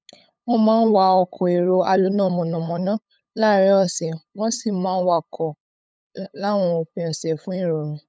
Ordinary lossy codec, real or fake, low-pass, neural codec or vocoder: none; fake; none; codec, 16 kHz, 8 kbps, FunCodec, trained on LibriTTS, 25 frames a second